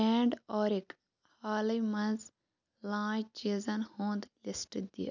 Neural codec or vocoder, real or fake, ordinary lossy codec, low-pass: none; real; none; 7.2 kHz